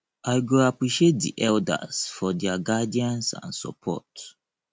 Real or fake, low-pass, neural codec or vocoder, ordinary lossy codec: real; none; none; none